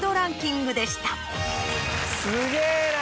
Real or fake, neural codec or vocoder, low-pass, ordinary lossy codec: real; none; none; none